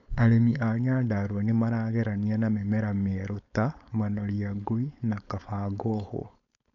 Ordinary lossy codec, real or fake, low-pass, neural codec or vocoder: none; fake; 7.2 kHz; codec, 16 kHz, 4.8 kbps, FACodec